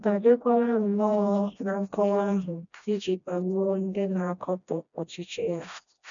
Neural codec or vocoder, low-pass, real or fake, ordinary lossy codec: codec, 16 kHz, 1 kbps, FreqCodec, smaller model; 7.2 kHz; fake; none